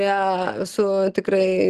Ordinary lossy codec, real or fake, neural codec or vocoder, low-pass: Opus, 16 kbps; real; none; 10.8 kHz